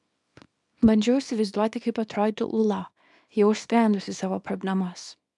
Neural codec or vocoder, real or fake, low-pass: codec, 24 kHz, 0.9 kbps, WavTokenizer, small release; fake; 10.8 kHz